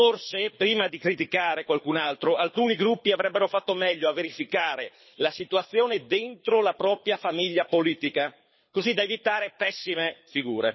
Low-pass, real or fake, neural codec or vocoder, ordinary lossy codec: 7.2 kHz; fake; codec, 24 kHz, 6 kbps, HILCodec; MP3, 24 kbps